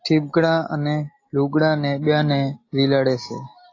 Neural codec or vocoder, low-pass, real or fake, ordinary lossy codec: none; 7.2 kHz; real; AAC, 32 kbps